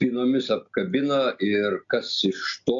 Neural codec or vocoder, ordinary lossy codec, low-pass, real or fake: none; AAC, 64 kbps; 7.2 kHz; real